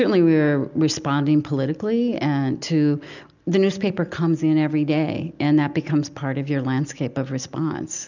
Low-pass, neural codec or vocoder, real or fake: 7.2 kHz; none; real